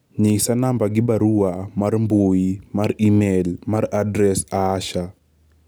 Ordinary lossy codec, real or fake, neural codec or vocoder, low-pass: none; real; none; none